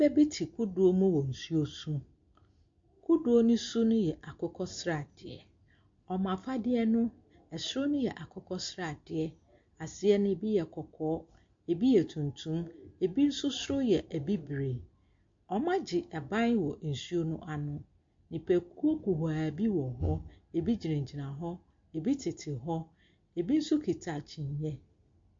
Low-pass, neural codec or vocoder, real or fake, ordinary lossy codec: 7.2 kHz; none; real; MP3, 48 kbps